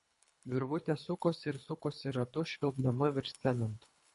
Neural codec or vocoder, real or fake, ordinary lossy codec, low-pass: codec, 24 kHz, 3 kbps, HILCodec; fake; MP3, 48 kbps; 10.8 kHz